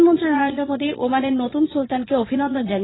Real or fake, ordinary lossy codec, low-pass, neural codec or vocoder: fake; AAC, 16 kbps; 7.2 kHz; vocoder, 22.05 kHz, 80 mel bands, Vocos